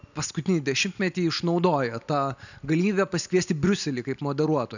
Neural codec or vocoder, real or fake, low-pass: none; real; 7.2 kHz